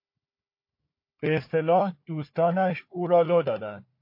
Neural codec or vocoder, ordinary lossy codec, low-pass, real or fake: codec, 16 kHz, 4 kbps, FunCodec, trained on Chinese and English, 50 frames a second; MP3, 32 kbps; 5.4 kHz; fake